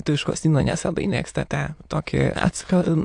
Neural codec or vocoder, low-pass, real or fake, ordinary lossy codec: autoencoder, 22.05 kHz, a latent of 192 numbers a frame, VITS, trained on many speakers; 9.9 kHz; fake; AAC, 64 kbps